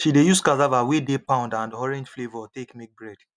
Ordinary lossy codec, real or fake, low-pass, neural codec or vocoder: none; real; 9.9 kHz; none